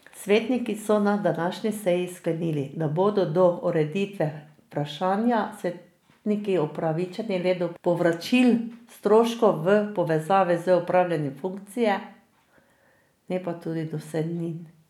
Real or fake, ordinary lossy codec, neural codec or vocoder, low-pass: real; none; none; 19.8 kHz